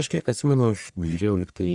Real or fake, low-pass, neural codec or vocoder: fake; 10.8 kHz; codec, 44.1 kHz, 1.7 kbps, Pupu-Codec